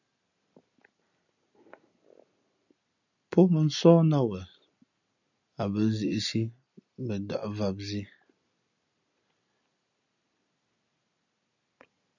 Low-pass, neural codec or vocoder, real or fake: 7.2 kHz; none; real